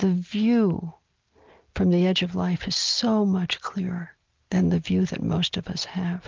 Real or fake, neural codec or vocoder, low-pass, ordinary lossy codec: real; none; 7.2 kHz; Opus, 24 kbps